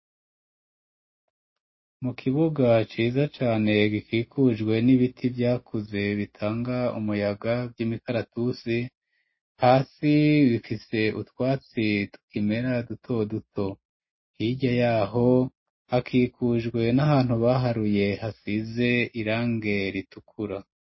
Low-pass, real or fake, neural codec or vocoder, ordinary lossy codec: 7.2 kHz; real; none; MP3, 24 kbps